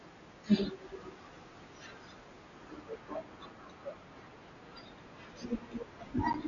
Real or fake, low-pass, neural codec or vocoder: real; 7.2 kHz; none